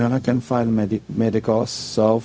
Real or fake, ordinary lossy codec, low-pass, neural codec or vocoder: fake; none; none; codec, 16 kHz, 0.4 kbps, LongCat-Audio-Codec